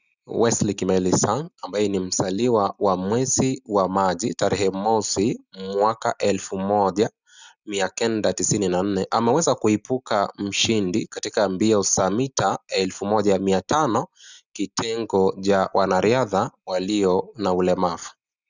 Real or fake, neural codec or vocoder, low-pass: real; none; 7.2 kHz